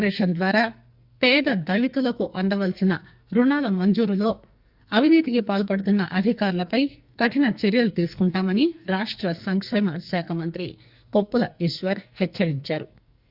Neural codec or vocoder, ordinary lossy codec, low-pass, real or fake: codec, 44.1 kHz, 2.6 kbps, SNAC; Opus, 64 kbps; 5.4 kHz; fake